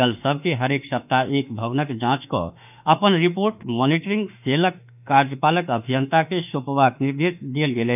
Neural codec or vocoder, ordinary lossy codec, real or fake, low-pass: autoencoder, 48 kHz, 32 numbers a frame, DAC-VAE, trained on Japanese speech; none; fake; 3.6 kHz